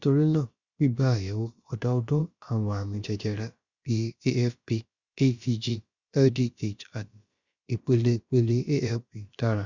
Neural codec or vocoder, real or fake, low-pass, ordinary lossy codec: codec, 16 kHz, about 1 kbps, DyCAST, with the encoder's durations; fake; 7.2 kHz; Opus, 64 kbps